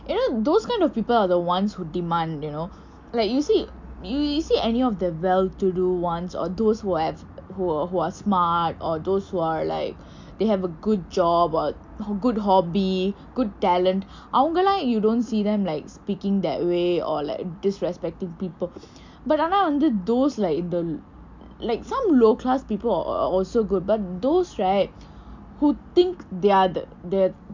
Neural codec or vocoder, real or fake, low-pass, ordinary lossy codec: none; real; 7.2 kHz; MP3, 64 kbps